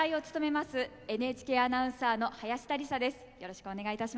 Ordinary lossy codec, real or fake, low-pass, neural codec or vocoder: none; real; none; none